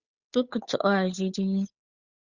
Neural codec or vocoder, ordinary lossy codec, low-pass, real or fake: codec, 16 kHz, 8 kbps, FunCodec, trained on Chinese and English, 25 frames a second; Opus, 64 kbps; 7.2 kHz; fake